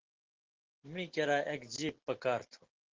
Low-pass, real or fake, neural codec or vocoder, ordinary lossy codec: 7.2 kHz; real; none; Opus, 16 kbps